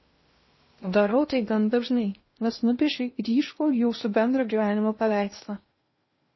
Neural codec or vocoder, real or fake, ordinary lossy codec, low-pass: codec, 16 kHz in and 24 kHz out, 0.6 kbps, FocalCodec, streaming, 2048 codes; fake; MP3, 24 kbps; 7.2 kHz